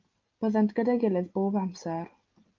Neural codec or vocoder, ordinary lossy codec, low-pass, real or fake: none; Opus, 24 kbps; 7.2 kHz; real